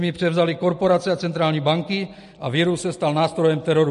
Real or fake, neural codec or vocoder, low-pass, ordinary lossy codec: real; none; 14.4 kHz; MP3, 48 kbps